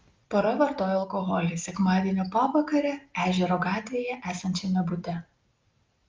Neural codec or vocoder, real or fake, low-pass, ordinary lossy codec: none; real; 7.2 kHz; Opus, 24 kbps